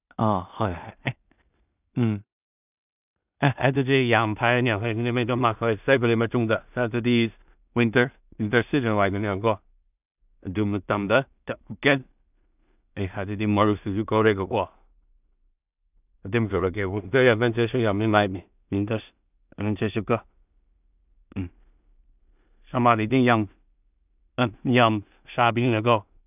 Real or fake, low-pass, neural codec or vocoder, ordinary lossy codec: fake; 3.6 kHz; codec, 16 kHz in and 24 kHz out, 0.4 kbps, LongCat-Audio-Codec, two codebook decoder; none